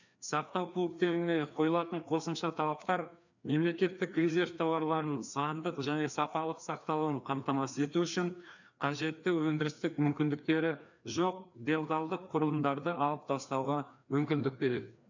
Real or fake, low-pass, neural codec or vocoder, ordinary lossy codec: fake; 7.2 kHz; codec, 16 kHz, 2 kbps, FreqCodec, larger model; none